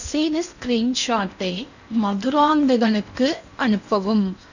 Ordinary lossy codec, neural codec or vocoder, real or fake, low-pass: none; codec, 16 kHz in and 24 kHz out, 0.8 kbps, FocalCodec, streaming, 65536 codes; fake; 7.2 kHz